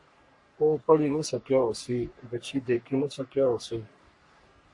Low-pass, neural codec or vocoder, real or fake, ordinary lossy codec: 10.8 kHz; codec, 44.1 kHz, 3.4 kbps, Pupu-Codec; fake; MP3, 48 kbps